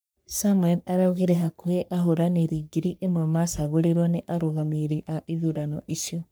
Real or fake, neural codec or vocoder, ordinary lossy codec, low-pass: fake; codec, 44.1 kHz, 3.4 kbps, Pupu-Codec; none; none